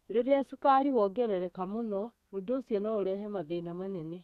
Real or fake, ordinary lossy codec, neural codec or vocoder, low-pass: fake; none; codec, 32 kHz, 1.9 kbps, SNAC; 14.4 kHz